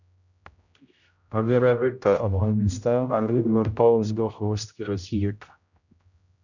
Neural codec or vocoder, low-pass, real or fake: codec, 16 kHz, 0.5 kbps, X-Codec, HuBERT features, trained on general audio; 7.2 kHz; fake